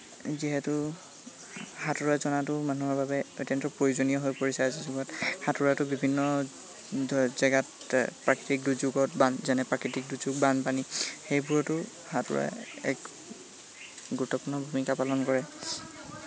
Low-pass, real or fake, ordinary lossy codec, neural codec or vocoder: none; real; none; none